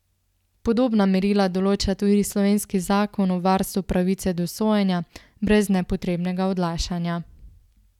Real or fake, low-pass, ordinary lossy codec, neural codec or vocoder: real; 19.8 kHz; none; none